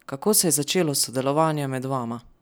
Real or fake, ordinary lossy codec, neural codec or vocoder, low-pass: real; none; none; none